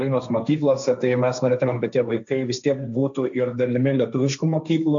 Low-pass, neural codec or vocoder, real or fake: 7.2 kHz; codec, 16 kHz, 1.1 kbps, Voila-Tokenizer; fake